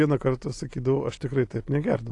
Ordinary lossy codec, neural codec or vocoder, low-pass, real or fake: AAC, 48 kbps; none; 10.8 kHz; real